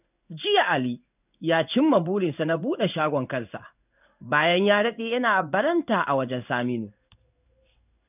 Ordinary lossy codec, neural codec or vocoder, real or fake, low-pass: none; codec, 16 kHz in and 24 kHz out, 1 kbps, XY-Tokenizer; fake; 3.6 kHz